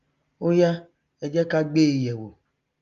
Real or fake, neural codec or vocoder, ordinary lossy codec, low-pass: real; none; Opus, 32 kbps; 7.2 kHz